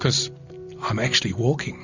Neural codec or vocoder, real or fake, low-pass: none; real; 7.2 kHz